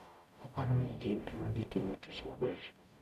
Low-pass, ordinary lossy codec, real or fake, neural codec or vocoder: 14.4 kHz; none; fake; codec, 44.1 kHz, 0.9 kbps, DAC